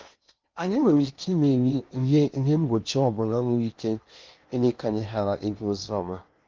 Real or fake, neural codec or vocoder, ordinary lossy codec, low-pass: fake; codec, 16 kHz in and 24 kHz out, 0.8 kbps, FocalCodec, streaming, 65536 codes; Opus, 24 kbps; 7.2 kHz